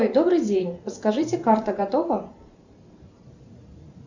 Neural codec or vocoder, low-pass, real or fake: vocoder, 44.1 kHz, 128 mel bands every 512 samples, BigVGAN v2; 7.2 kHz; fake